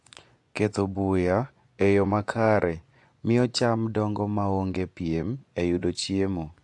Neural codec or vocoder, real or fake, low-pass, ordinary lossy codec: vocoder, 24 kHz, 100 mel bands, Vocos; fake; 10.8 kHz; AAC, 64 kbps